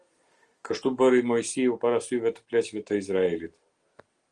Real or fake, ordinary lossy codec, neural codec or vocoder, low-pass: real; Opus, 24 kbps; none; 9.9 kHz